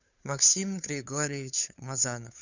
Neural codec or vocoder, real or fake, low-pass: codec, 16 kHz, 2 kbps, FunCodec, trained on Chinese and English, 25 frames a second; fake; 7.2 kHz